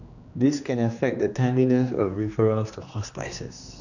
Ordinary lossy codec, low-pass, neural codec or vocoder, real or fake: none; 7.2 kHz; codec, 16 kHz, 2 kbps, X-Codec, HuBERT features, trained on general audio; fake